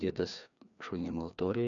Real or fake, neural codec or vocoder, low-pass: fake; codec, 16 kHz, 2 kbps, FreqCodec, larger model; 7.2 kHz